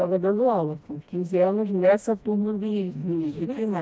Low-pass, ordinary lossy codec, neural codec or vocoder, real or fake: none; none; codec, 16 kHz, 1 kbps, FreqCodec, smaller model; fake